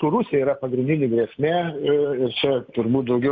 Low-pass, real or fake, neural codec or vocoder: 7.2 kHz; real; none